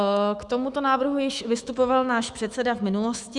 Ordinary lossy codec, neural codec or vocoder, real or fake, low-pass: Opus, 64 kbps; autoencoder, 48 kHz, 128 numbers a frame, DAC-VAE, trained on Japanese speech; fake; 10.8 kHz